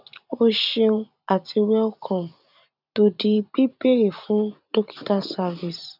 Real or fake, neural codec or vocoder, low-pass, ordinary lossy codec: fake; vocoder, 44.1 kHz, 128 mel bands every 512 samples, BigVGAN v2; 5.4 kHz; none